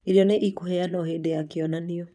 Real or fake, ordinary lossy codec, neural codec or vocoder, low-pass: fake; none; vocoder, 22.05 kHz, 80 mel bands, Vocos; none